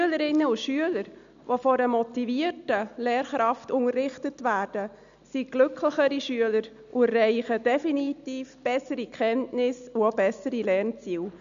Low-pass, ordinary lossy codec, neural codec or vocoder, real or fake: 7.2 kHz; none; none; real